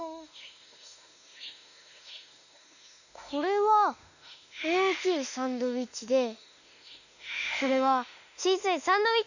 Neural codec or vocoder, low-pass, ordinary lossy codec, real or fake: autoencoder, 48 kHz, 32 numbers a frame, DAC-VAE, trained on Japanese speech; 7.2 kHz; none; fake